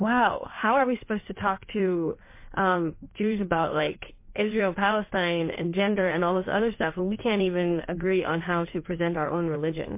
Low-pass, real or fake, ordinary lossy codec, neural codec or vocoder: 3.6 kHz; fake; MP3, 24 kbps; codec, 16 kHz in and 24 kHz out, 1.1 kbps, FireRedTTS-2 codec